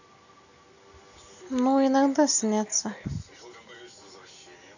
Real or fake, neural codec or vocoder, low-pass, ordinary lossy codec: real; none; 7.2 kHz; none